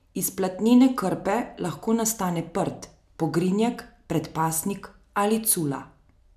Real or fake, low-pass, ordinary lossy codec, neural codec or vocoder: real; 14.4 kHz; none; none